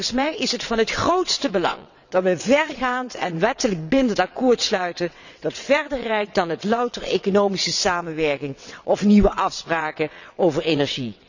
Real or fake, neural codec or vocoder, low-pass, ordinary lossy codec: fake; vocoder, 22.05 kHz, 80 mel bands, WaveNeXt; 7.2 kHz; none